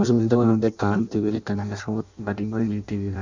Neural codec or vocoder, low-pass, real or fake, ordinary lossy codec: codec, 16 kHz in and 24 kHz out, 0.6 kbps, FireRedTTS-2 codec; 7.2 kHz; fake; none